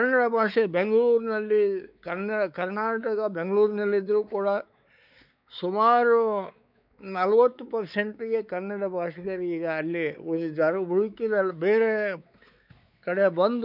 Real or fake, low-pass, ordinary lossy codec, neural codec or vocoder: fake; 5.4 kHz; none; codec, 16 kHz, 4 kbps, X-Codec, WavLM features, trained on Multilingual LibriSpeech